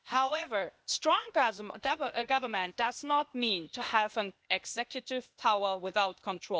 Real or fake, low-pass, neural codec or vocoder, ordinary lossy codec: fake; none; codec, 16 kHz, 0.8 kbps, ZipCodec; none